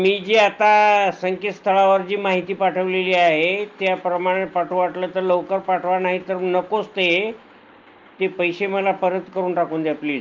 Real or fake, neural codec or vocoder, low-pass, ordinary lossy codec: real; none; 7.2 kHz; Opus, 32 kbps